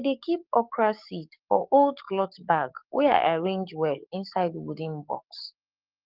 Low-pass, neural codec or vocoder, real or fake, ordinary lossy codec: 5.4 kHz; codec, 44.1 kHz, 7.8 kbps, DAC; fake; Opus, 32 kbps